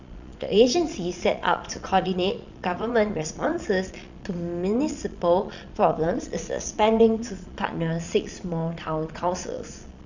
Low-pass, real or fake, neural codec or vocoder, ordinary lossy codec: 7.2 kHz; fake; vocoder, 22.05 kHz, 80 mel bands, Vocos; none